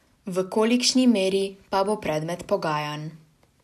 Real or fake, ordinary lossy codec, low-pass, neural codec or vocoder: real; none; 14.4 kHz; none